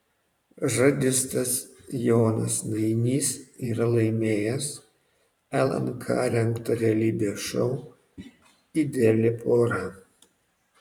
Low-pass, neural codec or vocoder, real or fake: 19.8 kHz; vocoder, 44.1 kHz, 128 mel bands every 512 samples, BigVGAN v2; fake